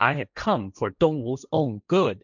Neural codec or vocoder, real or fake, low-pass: codec, 16 kHz, 1.1 kbps, Voila-Tokenizer; fake; 7.2 kHz